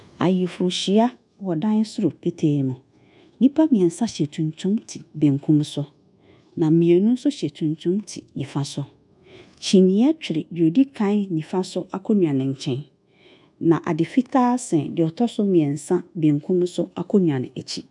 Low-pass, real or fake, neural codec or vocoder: 10.8 kHz; fake; codec, 24 kHz, 1.2 kbps, DualCodec